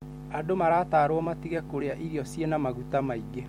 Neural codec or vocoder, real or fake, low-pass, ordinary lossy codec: vocoder, 44.1 kHz, 128 mel bands every 256 samples, BigVGAN v2; fake; 19.8 kHz; MP3, 64 kbps